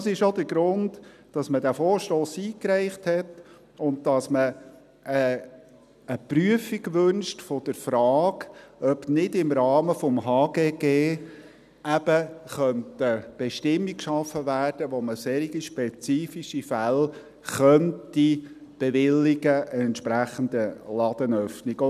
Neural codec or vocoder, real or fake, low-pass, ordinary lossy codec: none; real; 14.4 kHz; none